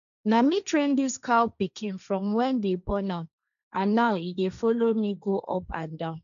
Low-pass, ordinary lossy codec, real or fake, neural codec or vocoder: 7.2 kHz; none; fake; codec, 16 kHz, 1.1 kbps, Voila-Tokenizer